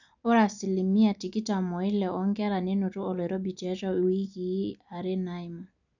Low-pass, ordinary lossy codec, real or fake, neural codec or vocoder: 7.2 kHz; none; real; none